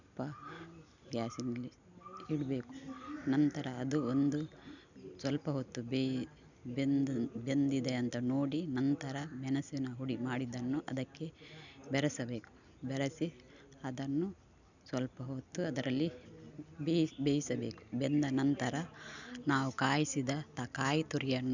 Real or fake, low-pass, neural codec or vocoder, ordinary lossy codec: real; 7.2 kHz; none; none